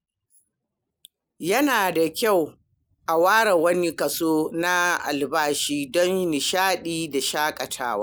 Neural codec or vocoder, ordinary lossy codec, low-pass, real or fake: none; none; none; real